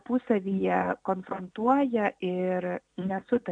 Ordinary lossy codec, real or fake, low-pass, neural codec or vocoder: AAC, 64 kbps; real; 9.9 kHz; none